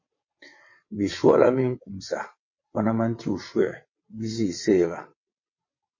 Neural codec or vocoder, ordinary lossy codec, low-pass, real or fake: vocoder, 22.05 kHz, 80 mel bands, WaveNeXt; MP3, 32 kbps; 7.2 kHz; fake